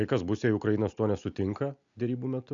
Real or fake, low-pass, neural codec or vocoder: real; 7.2 kHz; none